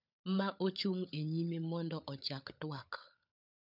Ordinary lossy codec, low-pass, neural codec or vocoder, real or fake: none; 5.4 kHz; codec, 16 kHz, 16 kbps, FunCodec, trained on LibriTTS, 50 frames a second; fake